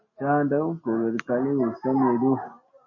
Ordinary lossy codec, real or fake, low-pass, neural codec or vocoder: MP3, 32 kbps; real; 7.2 kHz; none